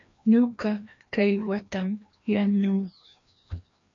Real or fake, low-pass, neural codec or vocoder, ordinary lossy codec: fake; 7.2 kHz; codec, 16 kHz, 1 kbps, FreqCodec, larger model; AAC, 64 kbps